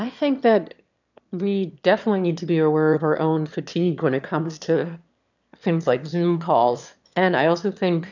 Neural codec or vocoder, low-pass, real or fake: autoencoder, 22.05 kHz, a latent of 192 numbers a frame, VITS, trained on one speaker; 7.2 kHz; fake